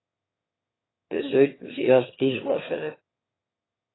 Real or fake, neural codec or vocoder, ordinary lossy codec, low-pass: fake; autoencoder, 22.05 kHz, a latent of 192 numbers a frame, VITS, trained on one speaker; AAC, 16 kbps; 7.2 kHz